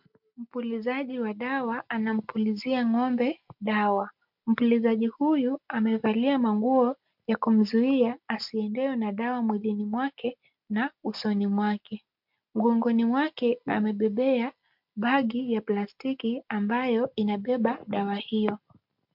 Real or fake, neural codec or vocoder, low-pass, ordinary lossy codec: real; none; 5.4 kHz; MP3, 48 kbps